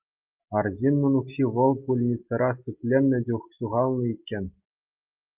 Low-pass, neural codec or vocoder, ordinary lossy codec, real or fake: 3.6 kHz; none; Opus, 24 kbps; real